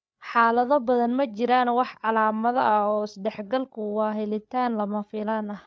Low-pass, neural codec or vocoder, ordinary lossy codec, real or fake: none; codec, 16 kHz, 8 kbps, FreqCodec, larger model; none; fake